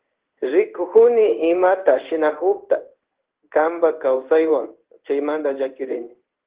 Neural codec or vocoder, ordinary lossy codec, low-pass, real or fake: codec, 16 kHz in and 24 kHz out, 1 kbps, XY-Tokenizer; Opus, 16 kbps; 3.6 kHz; fake